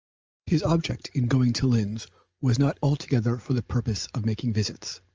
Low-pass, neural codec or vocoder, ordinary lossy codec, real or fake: 7.2 kHz; none; Opus, 24 kbps; real